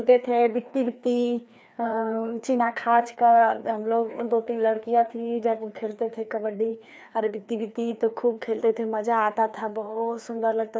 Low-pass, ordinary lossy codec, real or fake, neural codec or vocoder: none; none; fake; codec, 16 kHz, 2 kbps, FreqCodec, larger model